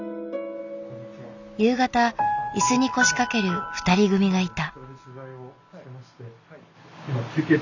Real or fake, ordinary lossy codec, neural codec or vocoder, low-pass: real; none; none; 7.2 kHz